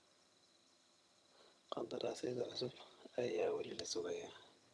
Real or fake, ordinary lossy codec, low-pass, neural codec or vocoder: fake; none; none; vocoder, 22.05 kHz, 80 mel bands, HiFi-GAN